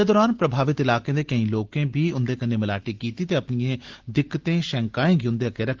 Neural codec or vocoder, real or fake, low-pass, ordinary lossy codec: none; real; 7.2 kHz; Opus, 16 kbps